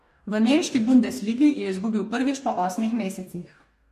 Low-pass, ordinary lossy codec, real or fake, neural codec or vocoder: 14.4 kHz; MP3, 64 kbps; fake; codec, 44.1 kHz, 2.6 kbps, DAC